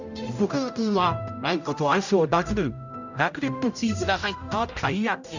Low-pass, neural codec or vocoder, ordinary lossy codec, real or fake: 7.2 kHz; codec, 16 kHz, 0.5 kbps, X-Codec, HuBERT features, trained on general audio; none; fake